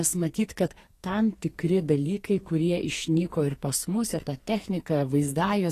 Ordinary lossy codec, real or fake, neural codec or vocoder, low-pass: AAC, 48 kbps; fake; codec, 44.1 kHz, 2.6 kbps, SNAC; 14.4 kHz